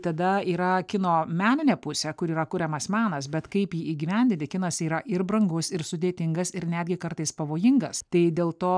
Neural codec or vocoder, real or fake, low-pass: none; real; 9.9 kHz